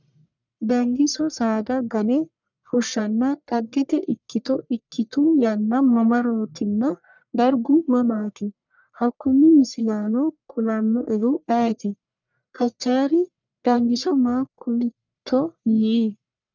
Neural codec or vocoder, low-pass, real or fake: codec, 44.1 kHz, 1.7 kbps, Pupu-Codec; 7.2 kHz; fake